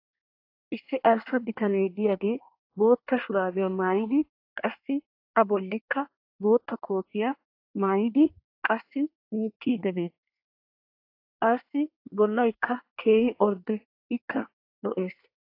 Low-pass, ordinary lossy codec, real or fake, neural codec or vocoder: 5.4 kHz; AAC, 32 kbps; fake; codec, 24 kHz, 1 kbps, SNAC